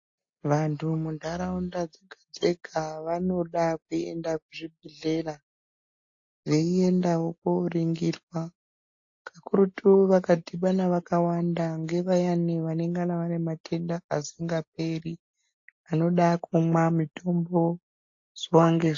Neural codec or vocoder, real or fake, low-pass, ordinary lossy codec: none; real; 7.2 kHz; AAC, 48 kbps